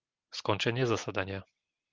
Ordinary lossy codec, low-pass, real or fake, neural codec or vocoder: Opus, 32 kbps; 7.2 kHz; real; none